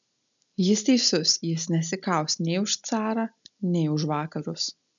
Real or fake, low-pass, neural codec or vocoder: real; 7.2 kHz; none